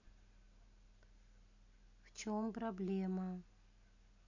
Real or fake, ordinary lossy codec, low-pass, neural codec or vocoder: real; none; 7.2 kHz; none